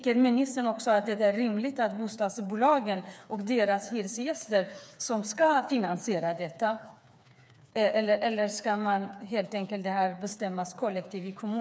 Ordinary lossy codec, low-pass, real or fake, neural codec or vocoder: none; none; fake; codec, 16 kHz, 4 kbps, FreqCodec, smaller model